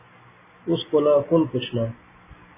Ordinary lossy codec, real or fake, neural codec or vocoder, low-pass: MP3, 16 kbps; real; none; 3.6 kHz